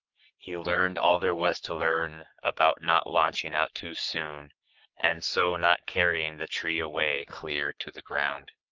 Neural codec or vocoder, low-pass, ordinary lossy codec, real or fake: codec, 44.1 kHz, 3.4 kbps, Pupu-Codec; 7.2 kHz; Opus, 32 kbps; fake